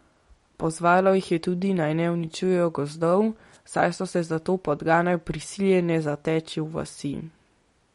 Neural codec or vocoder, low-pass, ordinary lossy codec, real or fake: none; 19.8 kHz; MP3, 48 kbps; real